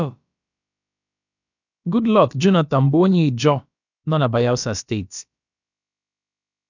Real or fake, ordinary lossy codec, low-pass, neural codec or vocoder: fake; none; 7.2 kHz; codec, 16 kHz, about 1 kbps, DyCAST, with the encoder's durations